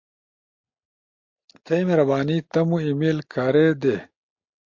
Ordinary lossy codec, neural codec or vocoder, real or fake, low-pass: MP3, 48 kbps; none; real; 7.2 kHz